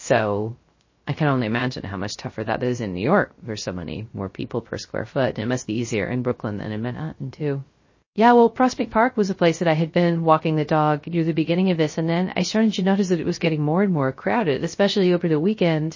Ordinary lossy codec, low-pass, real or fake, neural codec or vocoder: MP3, 32 kbps; 7.2 kHz; fake; codec, 16 kHz, 0.3 kbps, FocalCodec